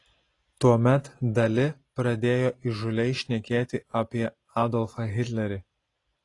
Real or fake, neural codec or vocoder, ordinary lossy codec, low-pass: real; none; AAC, 32 kbps; 10.8 kHz